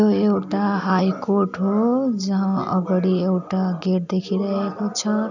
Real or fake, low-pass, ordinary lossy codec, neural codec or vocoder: real; 7.2 kHz; none; none